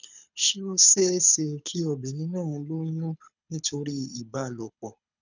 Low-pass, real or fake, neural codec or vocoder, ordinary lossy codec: 7.2 kHz; fake; codec, 24 kHz, 6 kbps, HILCodec; none